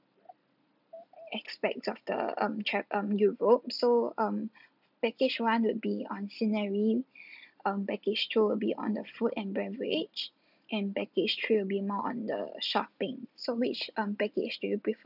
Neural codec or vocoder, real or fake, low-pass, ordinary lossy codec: none; real; 5.4 kHz; none